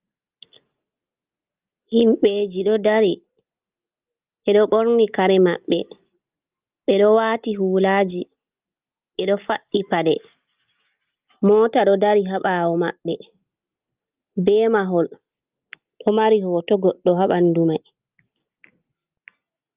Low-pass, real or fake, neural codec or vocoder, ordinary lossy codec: 3.6 kHz; real; none; Opus, 32 kbps